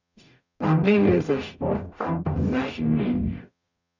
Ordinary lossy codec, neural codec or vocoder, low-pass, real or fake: none; codec, 44.1 kHz, 0.9 kbps, DAC; 7.2 kHz; fake